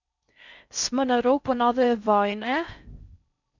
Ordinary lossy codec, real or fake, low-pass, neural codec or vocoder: none; fake; 7.2 kHz; codec, 16 kHz in and 24 kHz out, 0.6 kbps, FocalCodec, streaming, 4096 codes